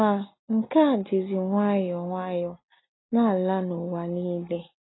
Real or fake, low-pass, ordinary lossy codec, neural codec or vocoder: real; 7.2 kHz; AAC, 16 kbps; none